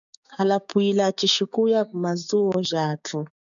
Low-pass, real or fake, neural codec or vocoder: 7.2 kHz; fake; codec, 16 kHz, 6 kbps, DAC